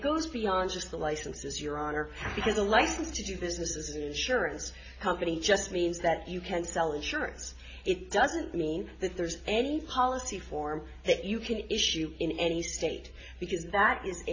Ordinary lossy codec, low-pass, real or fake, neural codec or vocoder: AAC, 32 kbps; 7.2 kHz; real; none